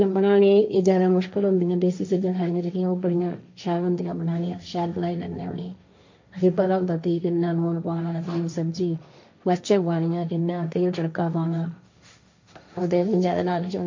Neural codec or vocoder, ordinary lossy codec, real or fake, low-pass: codec, 16 kHz, 1.1 kbps, Voila-Tokenizer; MP3, 48 kbps; fake; 7.2 kHz